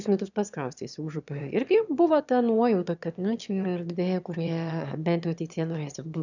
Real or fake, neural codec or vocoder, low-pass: fake; autoencoder, 22.05 kHz, a latent of 192 numbers a frame, VITS, trained on one speaker; 7.2 kHz